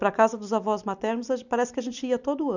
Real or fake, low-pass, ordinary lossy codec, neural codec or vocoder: fake; 7.2 kHz; none; vocoder, 44.1 kHz, 128 mel bands every 256 samples, BigVGAN v2